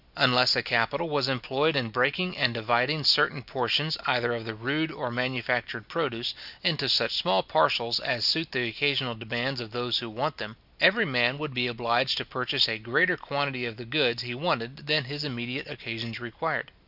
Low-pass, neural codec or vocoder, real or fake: 5.4 kHz; none; real